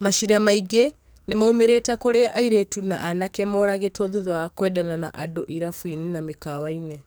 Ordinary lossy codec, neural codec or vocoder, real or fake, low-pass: none; codec, 44.1 kHz, 2.6 kbps, SNAC; fake; none